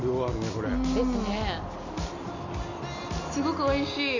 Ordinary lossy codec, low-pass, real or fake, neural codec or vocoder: none; 7.2 kHz; real; none